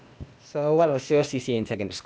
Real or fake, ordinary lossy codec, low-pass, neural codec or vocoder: fake; none; none; codec, 16 kHz, 0.8 kbps, ZipCodec